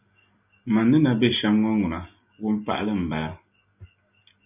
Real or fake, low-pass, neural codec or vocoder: real; 3.6 kHz; none